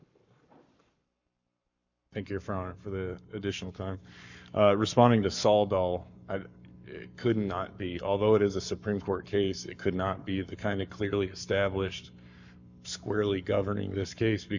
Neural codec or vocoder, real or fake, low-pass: codec, 44.1 kHz, 7.8 kbps, Pupu-Codec; fake; 7.2 kHz